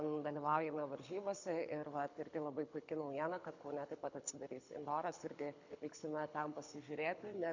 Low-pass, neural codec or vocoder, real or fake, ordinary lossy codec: 7.2 kHz; codec, 24 kHz, 6 kbps, HILCodec; fake; MP3, 64 kbps